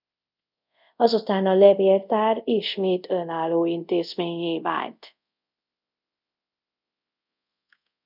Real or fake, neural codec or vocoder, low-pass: fake; codec, 24 kHz, 0.5 kbps, DualCodec; 5.4 kHz